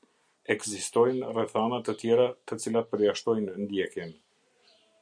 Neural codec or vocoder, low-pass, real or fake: none; 9.9 kHz; real